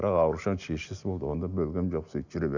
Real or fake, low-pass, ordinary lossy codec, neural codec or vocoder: real; 7.2 kHz; none; none